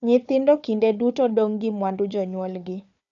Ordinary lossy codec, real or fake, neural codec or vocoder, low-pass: none; fake; codec, 16 kHz, 4 kbps, FunCodec, trained on Chinese and English, 50 frames a second; 7.2 kHz